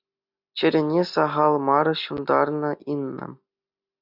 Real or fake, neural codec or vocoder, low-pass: real; none; 5.4 kHz